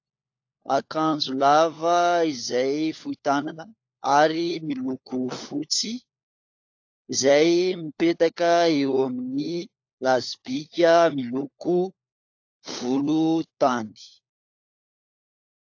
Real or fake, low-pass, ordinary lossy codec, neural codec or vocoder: fake; 7.2 kHz; AAC, 48 kbps; codec, 16 kHz, 4 kbps, FunCodec, trained on LibriTTS, 50 frames a second